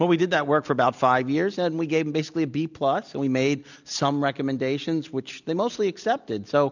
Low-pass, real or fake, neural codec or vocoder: 7.2 kHz; real; none